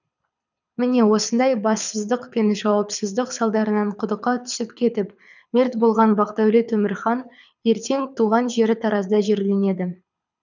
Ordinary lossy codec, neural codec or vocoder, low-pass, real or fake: none; codec, 24 kHz, 6 kbps, HILCodec; 7.2 kHz; fake